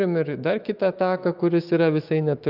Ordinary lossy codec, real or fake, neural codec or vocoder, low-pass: Opus, 24 kbps; fake; autoencoder, 48 kHz, 128 numbers a frame, DAC-VAE, trained on Japanese speech; 5.4 kHz